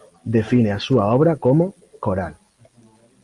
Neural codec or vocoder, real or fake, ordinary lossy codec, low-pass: none; real; Opus, 32 kbps; 10.8 kHz